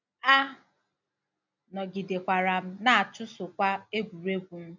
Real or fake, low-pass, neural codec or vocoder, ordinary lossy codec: real; 7.2 kHz; none; MP3, 64 kbps